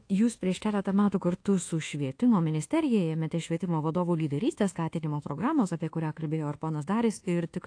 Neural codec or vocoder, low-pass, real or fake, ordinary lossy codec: codec, 24 kHz, 1.2 kbps, DualCodec; 9.9 kHz; fake; AAC, 48 kbps